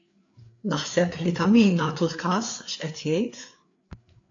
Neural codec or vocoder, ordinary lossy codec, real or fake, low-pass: codec, 16 kHz, 4 kbps, FreqCodec, larger model; AAC, 48 kbps; fake; 7.2 kHz